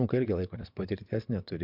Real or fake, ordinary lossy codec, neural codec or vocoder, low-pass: fake; MP3, 48 kbps; vocoder, 22.05 kHz, 80 mel bands, WaveNeXt; 5.4 kHz